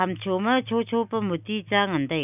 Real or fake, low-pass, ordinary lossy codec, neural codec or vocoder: real; 3.6 kHz; none; none